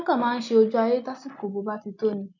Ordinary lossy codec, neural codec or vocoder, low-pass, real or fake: none; none; 7.2 kHz; real